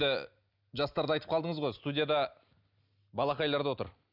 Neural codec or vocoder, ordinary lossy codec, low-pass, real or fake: none; none; 5.4 kHz; real